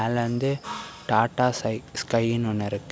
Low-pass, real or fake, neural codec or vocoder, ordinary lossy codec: none; real; none; none